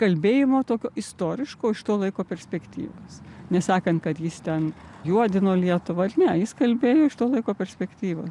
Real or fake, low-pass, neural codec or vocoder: real; 10.8 kHz; none